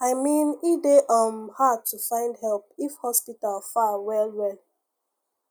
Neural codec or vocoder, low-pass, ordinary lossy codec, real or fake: none; none; none; real